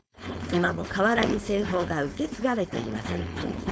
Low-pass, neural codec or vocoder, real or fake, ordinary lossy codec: none; codec, 16 kHz, 4.8 kbps, FACodec; fake; none